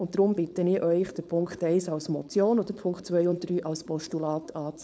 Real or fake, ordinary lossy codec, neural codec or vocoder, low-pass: fake; none; codec, 16 kHz, 4.8 kbps, FACodec; none